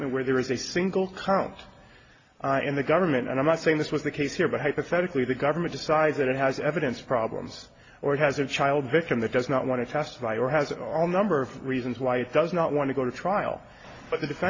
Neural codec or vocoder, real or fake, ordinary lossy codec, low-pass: none; real; AAC, 32 kbps; 7.2 kHz